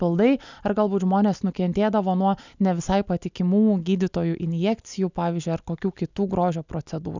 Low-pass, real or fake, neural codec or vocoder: 7.2 kHz; real; none